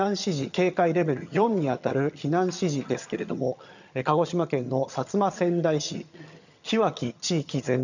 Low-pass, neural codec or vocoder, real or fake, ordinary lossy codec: 7.2 kHz; vocoder, 22.05 kHz, 80 mel bands, HiFi-GAN; fake; none